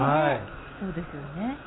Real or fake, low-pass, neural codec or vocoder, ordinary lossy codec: real; 7.2 kHz; none; AAC, 16 kbps